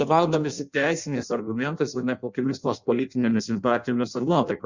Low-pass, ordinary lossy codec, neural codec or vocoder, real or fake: 7.2 kHz; Opus, 64 kbps; codec, 16 kHz in and 24 kHz out, 0.6 kbps, FireRedTTS-2 codec; fake